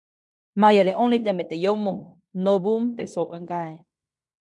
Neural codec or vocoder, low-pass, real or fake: codec, 16 kHz in and 24 kHz out, 0.9 kbps, LongCat-Audio-Codec, fine tuned four codebook decoder; 10.8 kHz; fake